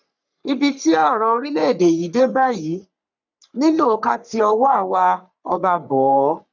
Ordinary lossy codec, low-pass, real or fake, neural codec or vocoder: none; 7.2 kHz; fake; codec, 44.1 kHz, 3.4 kbps, Pupu-Codec